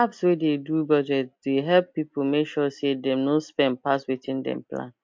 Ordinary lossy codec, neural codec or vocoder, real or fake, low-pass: MP3, 48 kbps; none; real; 7.2 kHz